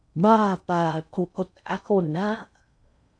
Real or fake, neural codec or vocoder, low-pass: fake; codec, 16 kHz in and 24 kHz out, 0.6 kbps, FocalCodec, streaming, 2048 codes; 9.9 kHz